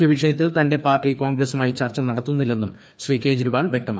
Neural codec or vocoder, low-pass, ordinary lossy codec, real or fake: codec, 16 kHz, 2 kbps, FreqCodec, larger model; none; none; fake